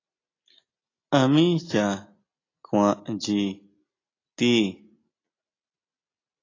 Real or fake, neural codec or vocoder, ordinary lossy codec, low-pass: real; none; AAC, 32 kbps; 7.2 kHz